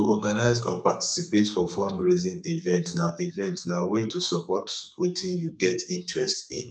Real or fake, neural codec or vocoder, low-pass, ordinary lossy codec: fake; codec, 32 kHz, 1.9 kbps, SNAC; 9.9 kHz; none